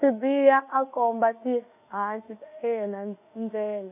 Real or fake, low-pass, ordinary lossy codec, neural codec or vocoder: fake; 3.6 kHz; none; autoencoder, 48 kHz, 32 numbers a frame, DAC-VAE, trained on Japanese speech